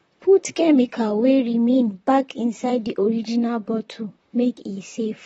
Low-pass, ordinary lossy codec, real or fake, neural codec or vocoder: 19.8 kHz; AAC, 24 kbps; fake; vocoder, 44.1 kHz, 128 mel bands, Pupu-Vocoder